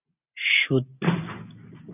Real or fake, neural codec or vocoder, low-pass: real; none; 3.6 kHz